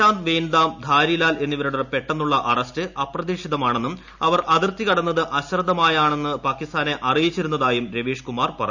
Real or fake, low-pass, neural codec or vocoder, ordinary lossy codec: real; 7.2 kHz; none; none